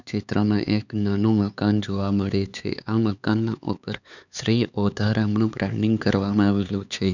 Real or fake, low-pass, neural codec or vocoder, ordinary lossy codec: fake; 7.2 kHz; codec, 16 kHz, 4 kbps, X-Codec, HuBERT features, trained on LibriSpeech; none